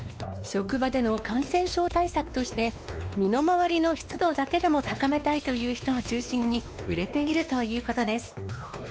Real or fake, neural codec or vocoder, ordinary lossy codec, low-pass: fake; codec, 16 kHz, 2 kbps, X-Codec, WavLM features, trained on Multilingual LibriSpeech; none; none